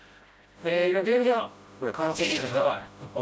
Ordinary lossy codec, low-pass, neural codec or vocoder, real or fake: none; none; codec, 16 kHz, 0.5 kbps, FreqCodec, smaller model; fake